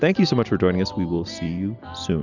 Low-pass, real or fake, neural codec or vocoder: 7.2 kHz; real; none